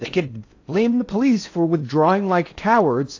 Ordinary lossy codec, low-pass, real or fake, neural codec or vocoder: AAC, 48 kbps; 7.2 kHz; fake; codec, 16 kHz in and 24 kHz out, 0.6 kbps, FocalCodec, streaming, 4096 codes